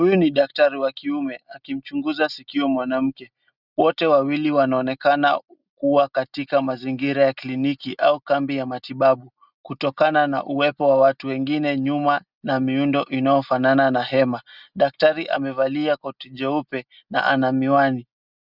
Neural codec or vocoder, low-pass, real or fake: none; 5.4 kHz; real